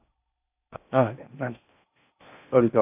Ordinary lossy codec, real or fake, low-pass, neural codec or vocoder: AAC, 32 kbps; fake; 3.6 kHz; codec, 16 kHz in and 24 kHz out, 0.6 kbps, FocalCodec, streaming, 4096 codes